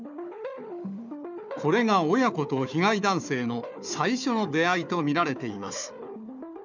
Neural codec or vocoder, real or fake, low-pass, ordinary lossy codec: codec, 16 kHz, 4 kbps, FunCodec, trained on Chinese and English, 50 frames a second; fake; 7.2 kHz; none